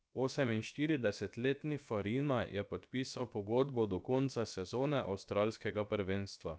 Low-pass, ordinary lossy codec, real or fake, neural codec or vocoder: none; none; fake; codec, 16 kHz, about 1 kbps, DyCAST, with the encoder's durations